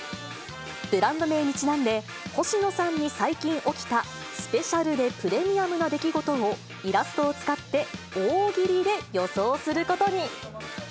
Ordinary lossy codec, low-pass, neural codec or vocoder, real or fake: none; none; none; real